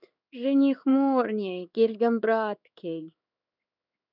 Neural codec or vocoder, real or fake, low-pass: codec, 16 kHz in and 24 kHz out, 1 kbps, XY-Tokenizer; fake; 5.4 kHz